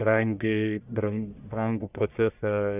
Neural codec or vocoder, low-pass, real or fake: codec, 44.1 kHz, 1.7 kbps, Pupu-Codec; 3.6 kHz; fake